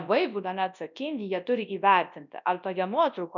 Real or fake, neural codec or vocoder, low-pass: fake; codec, 24 kHz, 0.9 kbps, WavTokenizer, large speech release; 7.2 kHz